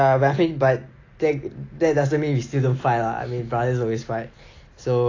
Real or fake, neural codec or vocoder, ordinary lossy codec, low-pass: real; none; AAC, 32 kbps; 7.2 kHz